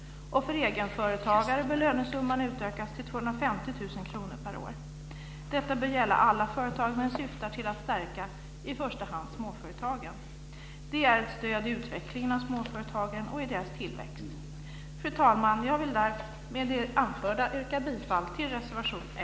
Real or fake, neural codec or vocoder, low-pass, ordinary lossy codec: real; none; none; none